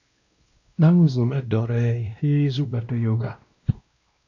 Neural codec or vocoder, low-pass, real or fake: codec, 16 kHz, 1 kbps, X-Codec, WavLM features, trained on Multilingual LibriSpeech; 7.2 kHz; fake